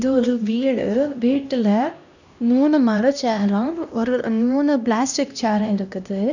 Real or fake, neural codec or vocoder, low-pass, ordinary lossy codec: fake; codec, 16 kHz, 1 kbps, X-Codec, HuBERT features, trained on LibriSpeech; 7.2 kHz; none